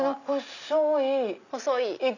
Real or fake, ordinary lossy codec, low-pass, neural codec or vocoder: real; none; 7.2 kHz; none